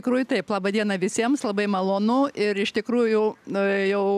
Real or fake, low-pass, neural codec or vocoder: real; 14.4 kHz; none